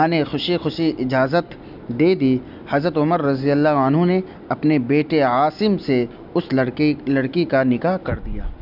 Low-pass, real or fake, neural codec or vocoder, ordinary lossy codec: 5.4 kHz; real; none; none